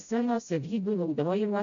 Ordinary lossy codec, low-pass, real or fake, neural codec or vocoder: AAC, 48 kbps; 7.2 kHz; fake; codec, 16 kHz, 0.5 kbps, FreqCodec, smaller model